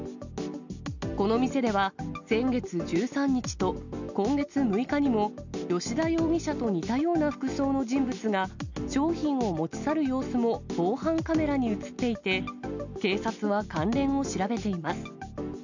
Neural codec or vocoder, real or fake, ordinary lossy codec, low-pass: none; real; none; 7.2 kHz